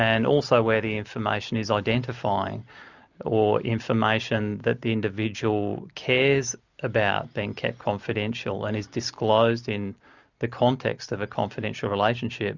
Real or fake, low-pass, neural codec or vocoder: real; 7.2 kHz; none